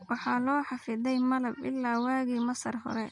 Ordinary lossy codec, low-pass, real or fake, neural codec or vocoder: MP3, 48 kbps; 10.8 kHz; real; none